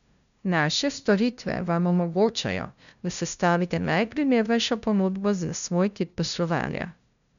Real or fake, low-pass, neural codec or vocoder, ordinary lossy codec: fake; 7.2 kHz; codec, 16 kHz, 0.5 kbps, FunCodec, trained on LibriTTS, 25 frames a second; none